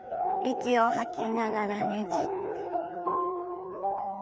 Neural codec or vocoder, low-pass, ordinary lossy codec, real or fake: codec, 16 kHz, 2 kbps, FreqCodec, larger model; none; none; fake